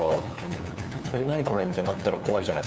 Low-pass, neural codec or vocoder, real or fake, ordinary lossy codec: none; codec, 16 kHz, 2 kbps, FunCodec, trained on LibriTTS, 25 frames a second; fake; none